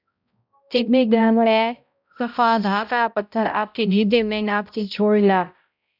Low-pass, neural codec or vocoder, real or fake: 5.4 kHz; codec, 16 kHz, 0.5 kbps, X-Codec, HuBERT features, trained on balanced general audio; fake